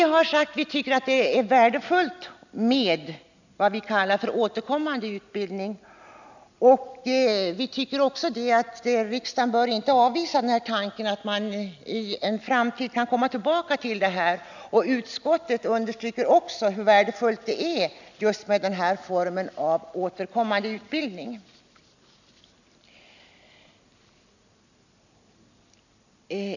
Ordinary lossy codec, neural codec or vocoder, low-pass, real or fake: none; none; 7.2 kHz; real